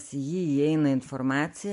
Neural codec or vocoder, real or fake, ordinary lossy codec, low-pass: none; real; MP3, 64 kbps; 10.8 kHz